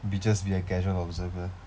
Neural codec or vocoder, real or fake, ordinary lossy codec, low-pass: none; real; none; none